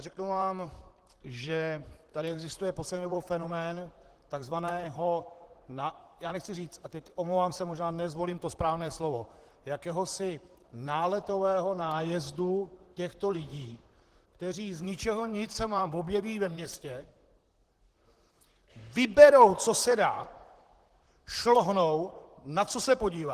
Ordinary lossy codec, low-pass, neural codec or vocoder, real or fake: Opus, 16 kbps; 14.4 kHz; vocoder, 44.1 kHz, 128 mel bands, Pupu-Vocoder; fake